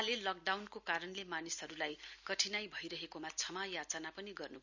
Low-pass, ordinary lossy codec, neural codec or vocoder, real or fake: 7.2 kHz; none; none; real